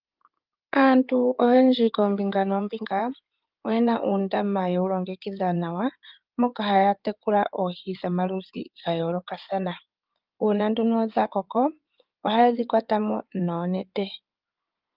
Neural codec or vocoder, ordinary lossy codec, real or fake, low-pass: codec, 16 kHz in and 24 kHz out, 2.2 kbps, FireRedTTS-2 codec; Opus, 24 kbps; fake; 5.4 kHz